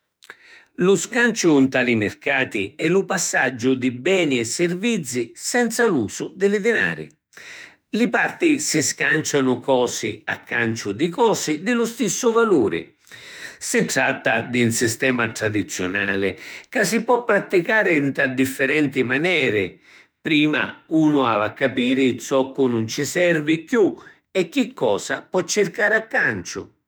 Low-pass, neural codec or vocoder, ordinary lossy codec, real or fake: none; autoencoder, 48 kHz, 32 numbers a frame, DAC-VAE, trained on Japanese speech; none; fake